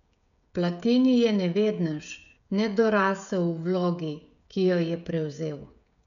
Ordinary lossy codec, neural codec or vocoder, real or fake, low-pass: none; codec, 16 kHz, 16 kbps, FreqCodec, smaller model; fake; 7.2 kHz